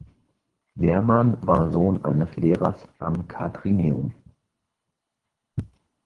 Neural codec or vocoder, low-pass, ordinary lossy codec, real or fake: codec, 24 kHz, 3 kbps, HILCodec; 10.8 kHz; Opus, 24 kbps; fake